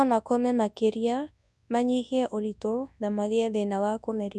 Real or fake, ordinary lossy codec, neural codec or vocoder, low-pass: fake; none; codec, 24 kHz, 0.9 kbps, WavTokenizer, large speech release; none